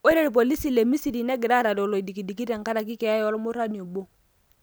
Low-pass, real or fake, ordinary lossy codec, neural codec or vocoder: none; real; none; none